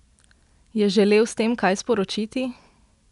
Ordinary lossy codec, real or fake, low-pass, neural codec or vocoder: none; real; 10.8 kHz; none